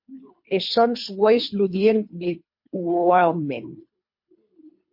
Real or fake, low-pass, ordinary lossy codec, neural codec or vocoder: fake; 5.4 kHz; MP3, 32 kbps; codec, 24 kHz, 3 kbps, HILCodec